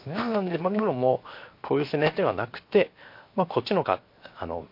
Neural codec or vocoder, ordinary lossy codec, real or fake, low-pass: codec, 16 kHz, 0.7 kbps, FocalCodec; MP3, 32 kbps; fake; 5.4 kHz